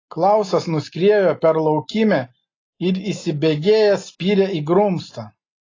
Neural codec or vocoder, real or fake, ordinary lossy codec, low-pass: none; real; AAC, 32 kbps; 7.2 kHz